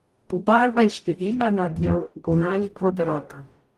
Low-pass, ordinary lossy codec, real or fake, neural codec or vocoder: 14.4 kHz; Opus, 24 kbps; fake; codec, 44.1 kHz, 0.9 kbps, DAC